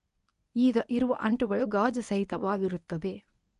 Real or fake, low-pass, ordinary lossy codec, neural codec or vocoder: fake; 10.8 kHz; none; codec, 24 kHz, 0.9 kbps, WavTokenizer, medium speech release version 1